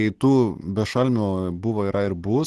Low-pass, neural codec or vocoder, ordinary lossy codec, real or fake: 10.8 kHz; none; Opus, 16 kbps; real